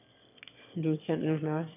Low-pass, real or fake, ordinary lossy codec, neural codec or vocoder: 3.6 kHz; fake; none; autoencoder, 22.05 kHz, a latent of 192 numbers a frame, VITS, trained on one speaker